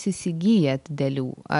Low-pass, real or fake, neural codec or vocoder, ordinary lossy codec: 10.8 kHz; real; none; MP3, 96 kbps